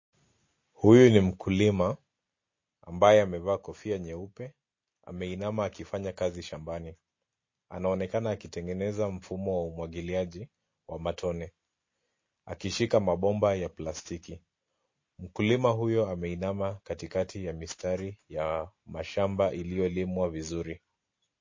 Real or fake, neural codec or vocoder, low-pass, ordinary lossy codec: real; none; 7.2 kHz; MP3, 32 kbps